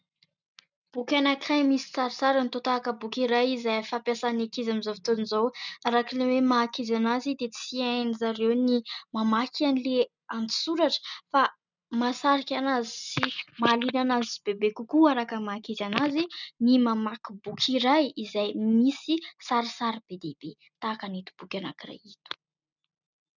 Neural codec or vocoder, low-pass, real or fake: none; 7.2 kHz; real